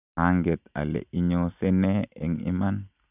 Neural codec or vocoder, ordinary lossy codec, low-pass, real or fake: none; none; 3.6 kHz; real